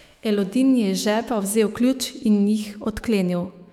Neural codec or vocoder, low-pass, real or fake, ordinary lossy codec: autoencoder, 48 kHz, 128 numbers a frame, DAC-VAE, trained on Japanese speech; 19.8 kHz; fake; none